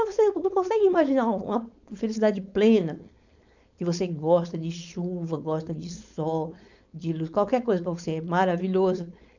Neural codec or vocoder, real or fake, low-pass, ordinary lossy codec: codec, 16 kHz, 4.8 kbps, FACodec; fake; 7.2 kHz; none